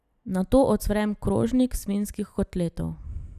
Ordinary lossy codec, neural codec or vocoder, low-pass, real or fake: none; none; 14.4 kHz; real